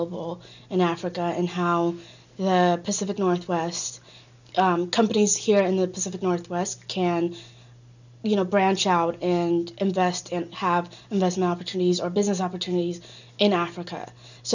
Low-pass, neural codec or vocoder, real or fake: 7.2 kHz; none; real